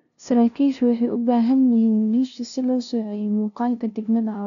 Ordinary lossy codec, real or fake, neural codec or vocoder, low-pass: none; fake; codec, 16 kHz, 0.5 kbps, FunCodec, trained on LibriTTS, 25 frames a second; 7.2 kHz